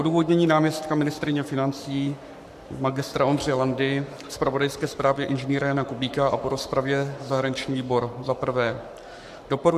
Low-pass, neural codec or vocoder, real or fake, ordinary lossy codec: 14.4 kHz; codec, 44.1 kHz, 7.8 kbps, Pupu-Codec; fake; AAC, 96 kbps